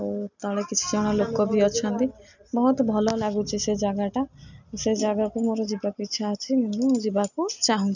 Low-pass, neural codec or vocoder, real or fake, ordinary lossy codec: 7.2 kHz; none; real; none